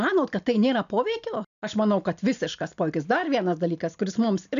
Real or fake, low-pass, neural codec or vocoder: real; 7.2 kHz; none